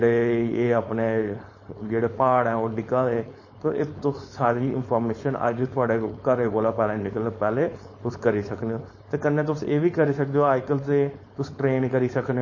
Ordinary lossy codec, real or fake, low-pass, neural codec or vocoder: MP3, 32 kbps; fake; 7.2 kHz; codec, 16 kHz, 4.8 kbps, FACodec